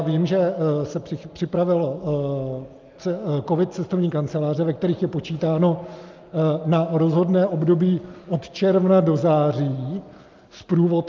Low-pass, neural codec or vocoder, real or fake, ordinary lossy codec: 7.2 kHz; none; real; Opus, 24 kbps